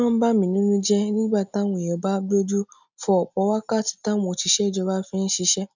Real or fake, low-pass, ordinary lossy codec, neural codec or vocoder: real; 7.2 kHz; none; none